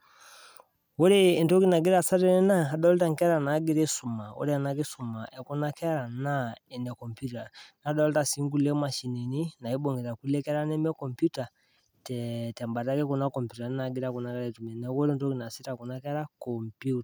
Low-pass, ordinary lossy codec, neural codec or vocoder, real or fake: none; none; none; real